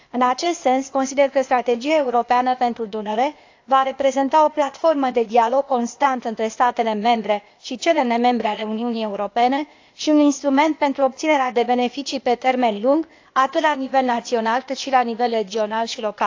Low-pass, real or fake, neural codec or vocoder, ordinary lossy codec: 7.2 kHz; fake; codec, 16 kHz, 0.8 kbps, ZipCodec; AAC, 48 kbps